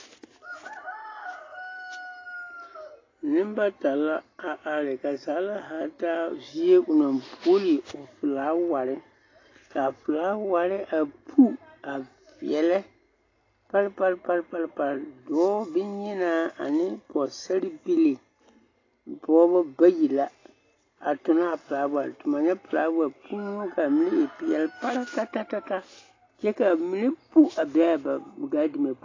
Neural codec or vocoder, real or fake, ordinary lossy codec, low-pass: none; real; AAC, 32 kbps; 7.2 kHz